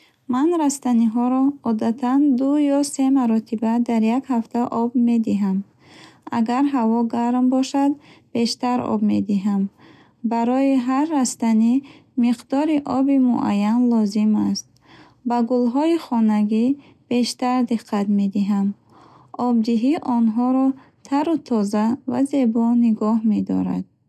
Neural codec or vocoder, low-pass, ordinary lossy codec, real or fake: none; 14.4 kHz; none; real